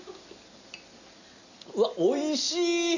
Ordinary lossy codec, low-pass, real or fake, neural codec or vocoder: none; 7.2 kHz; real; none